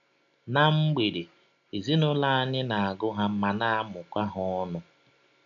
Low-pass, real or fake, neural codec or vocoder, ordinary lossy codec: 7.2 kHz; real; none; none